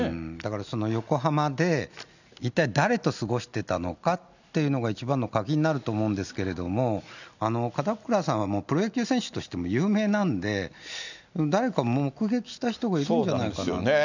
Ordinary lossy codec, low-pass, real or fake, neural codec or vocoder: none; 7.2 kHz; real; none